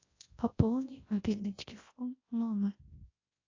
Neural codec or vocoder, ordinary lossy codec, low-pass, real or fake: codec, 24 kHz, 0.9 kbps, WavTokenizer, large speech release; AAC, 32 kbps; 7.2 kHz; fake